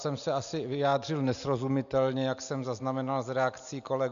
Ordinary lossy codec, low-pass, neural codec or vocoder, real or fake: AAC, 96 kbps; 7.2 kHz; none; real